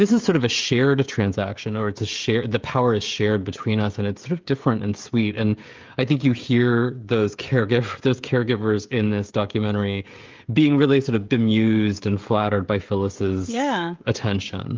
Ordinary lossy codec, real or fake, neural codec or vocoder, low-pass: Opus, 32 kbps; fake; codec, 16 kHz, 16 kbps, FreqCodec, smaller model; 7.2 kHz